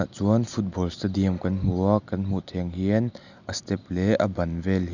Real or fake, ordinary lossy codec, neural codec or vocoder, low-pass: real; none; none; 7.2 kHz